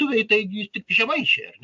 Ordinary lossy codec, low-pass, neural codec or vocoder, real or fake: AAC, 64 kbps; 7.2 kHz; none; real